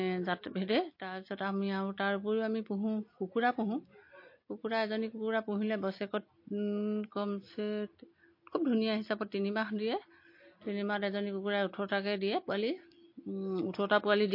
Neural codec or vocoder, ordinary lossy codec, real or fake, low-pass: none; MP3, 32 kbps; real; 5.4 kHz